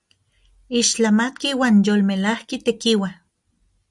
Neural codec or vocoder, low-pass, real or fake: none; 10.8 kHz; real